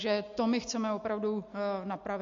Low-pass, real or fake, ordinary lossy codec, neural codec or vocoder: 7.2 kHz; real; MP3, 48 kbps; none